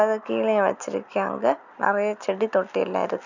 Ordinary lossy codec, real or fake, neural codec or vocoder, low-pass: none; real; none; 7.2 kHz